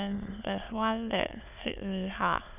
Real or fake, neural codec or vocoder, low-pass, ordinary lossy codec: fake; autoencoder, 22.05 kHz, a latent of 192 numbers a frame, VITS, trained on many speakers; 3.6 kHz; none